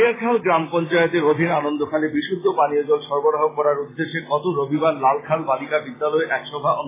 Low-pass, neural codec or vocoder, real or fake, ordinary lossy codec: 3.6 kHz; none; real; AAC, 16 kbps